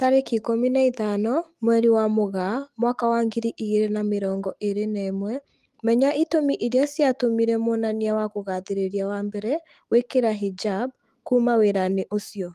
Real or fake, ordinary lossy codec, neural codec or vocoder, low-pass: fake; Opus, 24 kbps; autoencoder, 48 kHz, 128 numbers a frame, DAC-VAE, trained on Japanese speech; 14.4 kHz